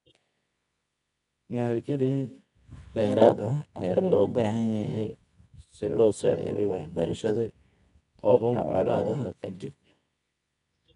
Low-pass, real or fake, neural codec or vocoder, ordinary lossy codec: 10.8 kHz; fake; codec, 24 kHz, 0.9 kbps, WavTokenizer, medium music audio release; none